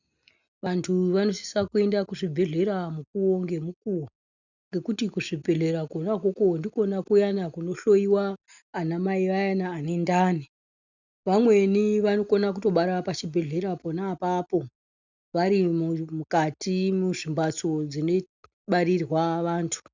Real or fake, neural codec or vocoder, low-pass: real; none; 7.2 kHz